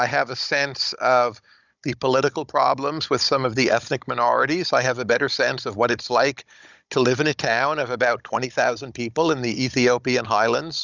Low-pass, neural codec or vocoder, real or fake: 7.2 kHz; codec, 16 kHz, 16 kbps, FunCodec, trained on Chinese and English, 50 frames a second; fake